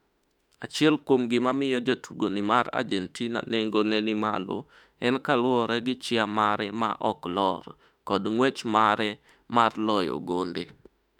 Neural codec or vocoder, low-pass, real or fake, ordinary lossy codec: autoencoder, 48 kHz, 32 numbers a frame, DAC-VAE, trained on Japanese speech; 19.8 kHz; fake; none